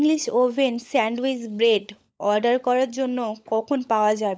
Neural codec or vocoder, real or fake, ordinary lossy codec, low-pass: codec, 16 kHz, 16 kbps, FunCodec, trained on LibriTTS, 50 frames a second; fake; none; none